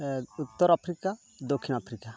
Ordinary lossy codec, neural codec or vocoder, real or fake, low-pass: none; none; real; none